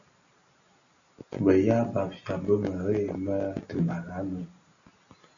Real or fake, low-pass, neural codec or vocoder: real; 7.2 kHz; none